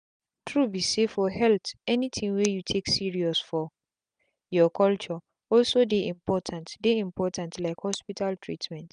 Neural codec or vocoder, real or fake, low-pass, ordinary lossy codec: none; real; 9.9 kHz; none